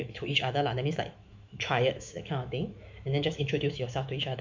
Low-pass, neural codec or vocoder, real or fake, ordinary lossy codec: 7.2 kHz; autoencoder, 48 kHz, 128 numbers a frame, DAC-VAE, trained on Japanese speech; fake; MP3, 64 kbps